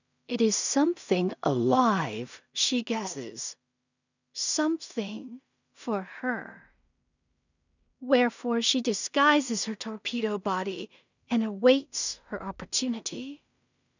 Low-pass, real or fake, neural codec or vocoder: 7.2 kHz; fake; codec, 16 kHz in and 24 kHz out, 0.4 kbps, LongCat-Audio-Codec, two codebook decoder